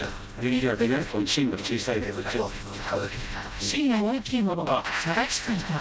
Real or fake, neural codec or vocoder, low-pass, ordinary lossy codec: fake; codec, 16 kHz, 0.5 kbps, FreqCodec, smaller model; none; none